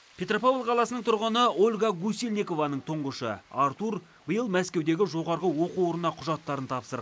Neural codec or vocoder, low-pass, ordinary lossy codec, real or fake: none; none; none; real